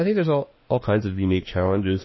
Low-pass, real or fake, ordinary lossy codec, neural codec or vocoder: 7.2 kHz; fake; MP3, 24 kbps; autoencoder, 48 kHz, 32 numbers a frame, DAC-VAE, trained on Japanese speech